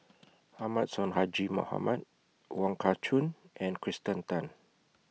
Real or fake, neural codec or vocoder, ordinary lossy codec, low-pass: real; none; none; none